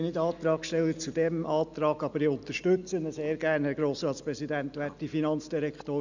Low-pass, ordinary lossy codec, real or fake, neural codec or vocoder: 7.2 kHz; none; real; none